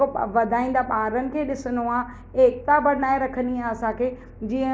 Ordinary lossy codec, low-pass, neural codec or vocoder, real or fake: none; none; none; real